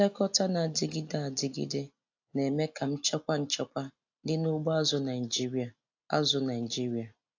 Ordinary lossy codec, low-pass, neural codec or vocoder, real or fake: AAC, 48 kbps; 7.2 kHz; none; real